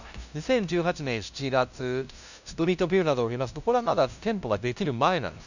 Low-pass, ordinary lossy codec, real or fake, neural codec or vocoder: 7.2 kHz; none; fake; codec, 16 kHz, 0.5 kbps, FunCodec, trained on LibriTTS, 25 frames a second